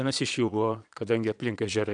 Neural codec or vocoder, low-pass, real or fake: vocoder, 22.05 kHz, 80 mel bands, Vocos; 9.9 kHz; fake